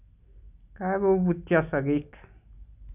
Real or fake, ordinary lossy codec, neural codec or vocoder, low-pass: real; none; none; 3.6 kHz